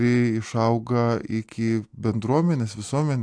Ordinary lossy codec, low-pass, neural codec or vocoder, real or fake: AAC, 64 kbps; 9.9 kHz; none; real